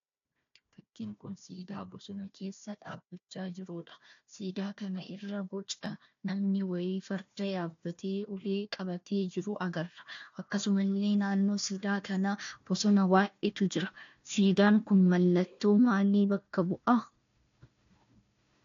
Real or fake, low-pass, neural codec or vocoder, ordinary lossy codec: fake; 7.2 kHz; codec, 16 kHz, 1 kbps, FunCodec, trained on Chinese and English, 50 frames a second; AAC, 48 kbps